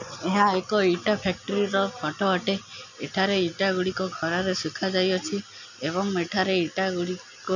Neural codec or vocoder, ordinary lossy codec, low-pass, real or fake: none; none; 7.2 kHz; real